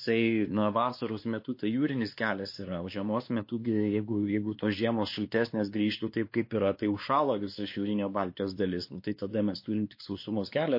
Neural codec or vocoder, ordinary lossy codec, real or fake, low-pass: codec, 16 kHz, 2 kbps, X-Codec, WavLM features, trained on Multilingual LibriSpeech; MP3, 32 kbps; fake; 5.4 kHz